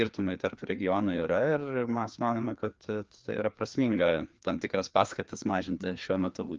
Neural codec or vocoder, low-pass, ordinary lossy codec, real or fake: codec, 16 kHz, 2 kbps, FreqCodec, larger model; 7.2 kHz; Opus, 24 kbps; fake